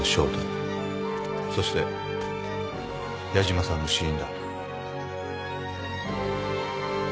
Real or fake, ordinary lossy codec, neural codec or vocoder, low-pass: real; none; none; none